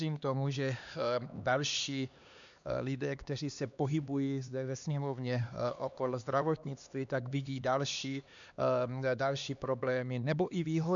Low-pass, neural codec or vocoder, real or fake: 7.2 kHz; codec, 16 kHz, 2 kbps, X-Codec, HuBERT features, trained on LibriSpeech; fake